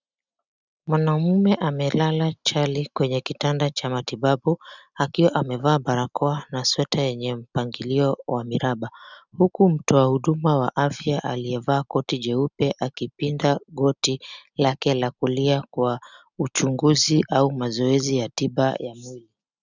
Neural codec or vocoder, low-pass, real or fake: none; 7.2 kHz; real